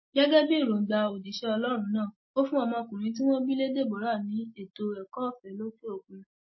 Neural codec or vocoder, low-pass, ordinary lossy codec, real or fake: none; 7.2 kHz; MP3, 24 kbps; real